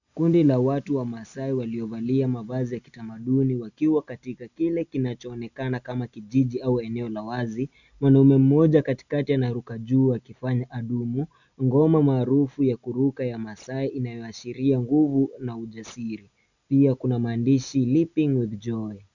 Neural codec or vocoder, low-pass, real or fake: none; 7.2 kHz; real